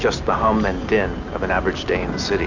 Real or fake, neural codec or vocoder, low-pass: real; none; 7.2 kHz